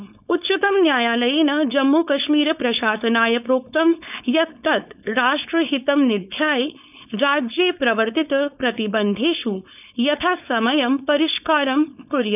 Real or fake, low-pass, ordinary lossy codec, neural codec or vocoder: fake; 3.6 kHz; none; codec, 16 kHz, 4.8 kbps, FACodec